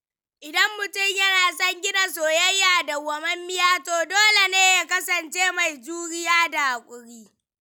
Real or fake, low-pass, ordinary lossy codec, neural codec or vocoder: real; none; none; none